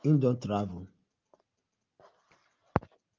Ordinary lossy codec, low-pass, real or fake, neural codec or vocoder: Opus, 32 kbps; 7.2 kHz; real; none